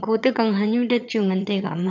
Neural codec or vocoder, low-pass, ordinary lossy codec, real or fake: vocoder, 22.05 kHz, 80 mel bands, HiFi-GAN; 7.2 kHz; none; fake